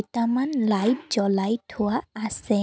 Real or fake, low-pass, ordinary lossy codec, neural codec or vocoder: real; none; none; none